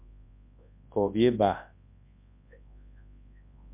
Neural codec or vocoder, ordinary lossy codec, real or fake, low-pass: codec, 24 kHz, 0.9 kbps, WavTokenizer, large speech release; MP3, 32 kbps; fake; 3.6 kHz